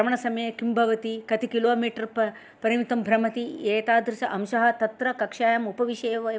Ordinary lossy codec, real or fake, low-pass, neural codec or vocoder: none; real; none; none